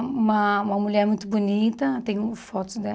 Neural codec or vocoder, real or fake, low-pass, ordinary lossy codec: none; real; none; none